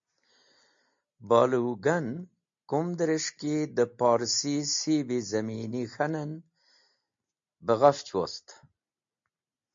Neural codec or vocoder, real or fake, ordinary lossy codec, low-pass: none; real; MP3, 48 kbps; 7.2 kHz